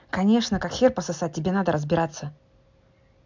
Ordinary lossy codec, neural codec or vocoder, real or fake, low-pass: MP3, 64 kbps; none; real; 7.2 kHz